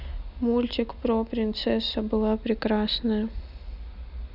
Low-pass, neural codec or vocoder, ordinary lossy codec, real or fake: 5.4 kHz; none; none; real